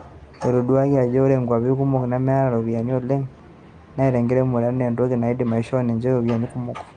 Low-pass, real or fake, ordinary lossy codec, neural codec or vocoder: 9.9 kHz; real; Opus, 32 kbps; none